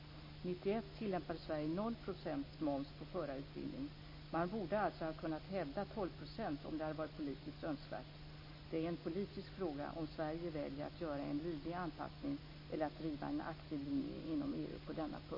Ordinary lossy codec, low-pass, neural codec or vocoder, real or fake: MP3, 24 kbps; 5.4 kHz; none; real